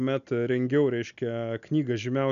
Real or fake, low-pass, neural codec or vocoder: real; 7.2 kHz; none